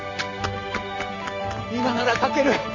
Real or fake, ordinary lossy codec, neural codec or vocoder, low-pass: real; MP3, 48 kbps; none; 7.2 kHz